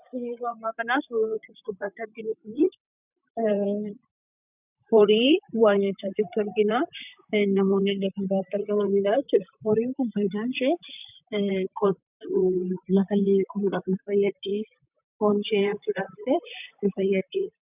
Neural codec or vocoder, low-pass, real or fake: vocoder, 44.1 kHz, 128 mel bands, Pupu-Vocoder; 3.6 kHz; fake